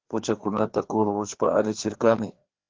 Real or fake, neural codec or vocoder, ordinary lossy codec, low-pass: fake; codec, 16 kHz, 2 kbps, FreqCodec, larger model; Opus, 16 kbps; 7.2 kHz